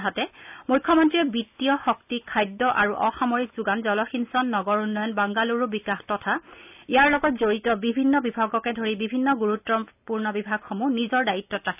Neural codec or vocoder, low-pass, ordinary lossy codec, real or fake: none; 3.6 kHz; none; real